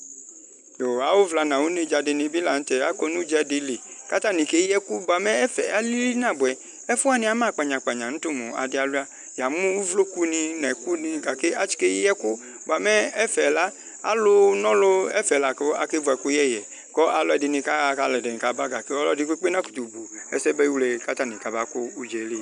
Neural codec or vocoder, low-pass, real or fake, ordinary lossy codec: none; 9.9 kHz; real; MP3, 96 kbps